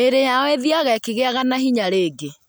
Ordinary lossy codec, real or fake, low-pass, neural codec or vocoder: none; real; none; none